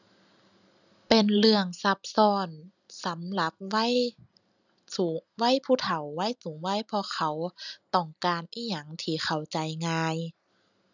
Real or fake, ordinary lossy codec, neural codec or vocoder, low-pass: real; none; none; 7.2 kHz